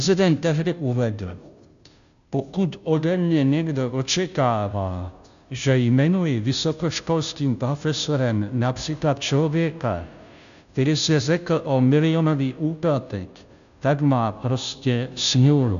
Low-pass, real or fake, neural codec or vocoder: 7.2 kHz; fake; codec, 16 kHz, 0.5 kbps, FunCodec, trained on Chinese and English, 25 frames a second